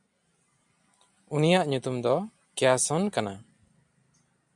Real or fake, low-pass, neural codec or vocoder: real; 10.8 kHz; none